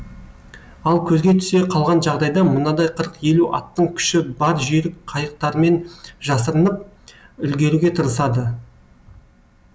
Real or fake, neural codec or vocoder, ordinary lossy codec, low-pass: real; none; none; none